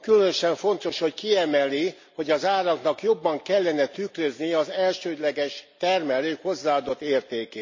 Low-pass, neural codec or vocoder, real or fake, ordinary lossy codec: 7.2 kHz; none; real; MP3, 64 kbps